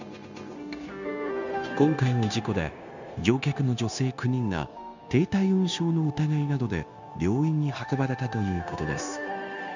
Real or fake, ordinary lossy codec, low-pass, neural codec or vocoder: fake; none; 7.2 kHz; codec, 16 kHz, 0.9 kbps, LongCat-Audio-Codec